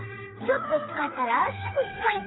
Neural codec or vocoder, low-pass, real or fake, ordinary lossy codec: codec, 16 kHz, 4 kbps, FreqCodec, smaller model; 7.2 kHz; fake; AAC, 16 kbps